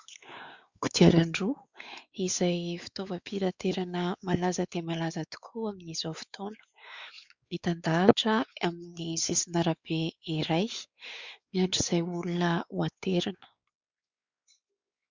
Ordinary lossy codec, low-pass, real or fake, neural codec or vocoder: Opus, 64 kbps; 7.2 kHz; fake; codec, 16 kHz, 4 kbps, X-Codec, WavLM features, trained on Multilingual LibriSpeech